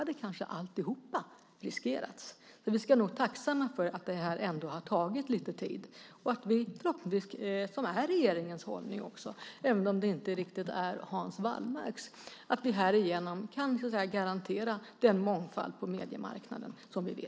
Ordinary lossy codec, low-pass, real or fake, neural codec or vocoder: none; none; real; none